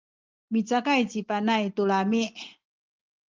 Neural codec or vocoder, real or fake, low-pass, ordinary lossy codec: none; real; 7.2 kHz; Opus, 32 kbps